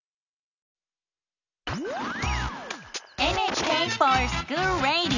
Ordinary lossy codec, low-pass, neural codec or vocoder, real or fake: none; 7.2 kHz; none; real